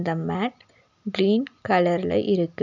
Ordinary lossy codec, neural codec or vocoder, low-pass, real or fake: none; none; 7.2 kHz; real